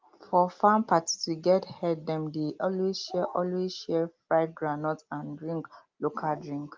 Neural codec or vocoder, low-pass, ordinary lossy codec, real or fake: none; 7.2 kHz; Opus, 32 kbps; real